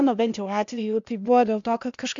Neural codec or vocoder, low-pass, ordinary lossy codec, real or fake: codec, 16 kHz, 0.8 kbps, ZipCodec; 7.2 kHz; MP3, 64 kbps; fake